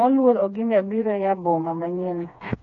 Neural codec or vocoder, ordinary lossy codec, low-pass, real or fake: codec, 16 kHz, 2 kbps, FreqCodec, smaller model; none; 7.2 kHz; fake